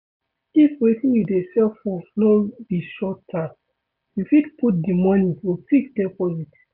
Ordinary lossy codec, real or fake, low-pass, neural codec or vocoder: AAC, 48 kbps; real; 5.4 kHz; none